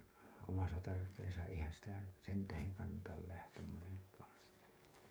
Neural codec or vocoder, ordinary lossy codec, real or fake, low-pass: codec, 44.1 kHz, 7.8 kbps, DAC; none; fake; none